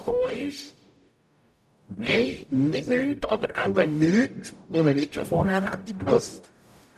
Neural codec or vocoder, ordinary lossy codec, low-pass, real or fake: codec, 44.1 kHz, 0.9 kbps, DAC; none; 14.4 kHz; fake